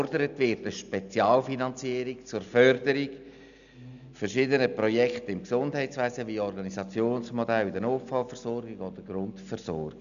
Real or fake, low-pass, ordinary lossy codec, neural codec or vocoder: real; 7.2 kHz; none; none